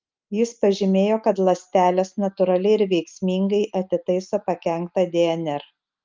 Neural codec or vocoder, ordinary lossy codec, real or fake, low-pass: none; Opus, 24 kbps; real; 7.2 kHz